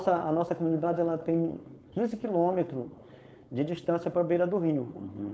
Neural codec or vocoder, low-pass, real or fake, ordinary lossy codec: codec, 16 kHz, 4.8 kbps, FACodec; none; fake; none